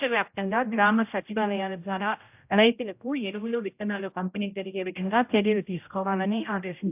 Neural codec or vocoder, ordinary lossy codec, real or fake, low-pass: codec, 16 kHz, 0.5 kbps, X-Codec, HuBERT features, trained on general audio; AAC, 32 kbps; fake; 3.6 kHz